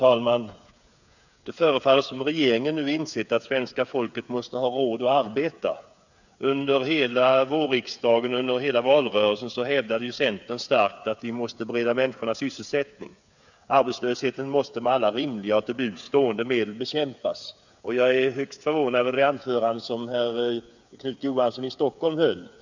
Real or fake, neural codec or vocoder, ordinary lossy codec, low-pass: fake; codec, 16 kHz, 8 kbps, FreqCodec, smaller model; none; 7.2 kHz